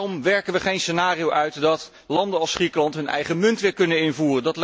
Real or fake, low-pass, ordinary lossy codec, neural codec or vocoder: real; none; none; none